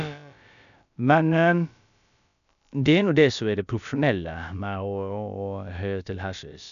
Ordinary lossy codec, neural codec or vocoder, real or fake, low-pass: none; codec, 16 kHz, about 1 kbps, DyCAST, with the encoder's durations; fake; 7.2 kHz